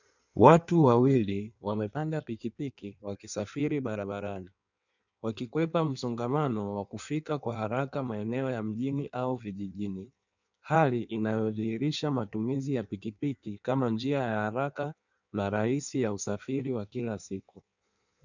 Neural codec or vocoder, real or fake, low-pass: codec, 16 kHz in and 24 kHz out, 1.1 kbps, FireRedTTS-2 codec; fake; 7.2 kHz